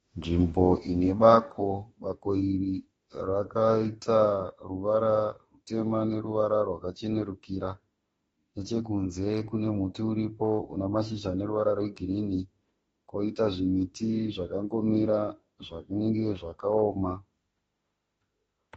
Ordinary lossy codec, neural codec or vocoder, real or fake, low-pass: AAC, 24 kbps; autoencoder, 48 kHz, 32 numbers a frame, DAC-VAE, trained on Japanese speech; fake; 19.8 kHz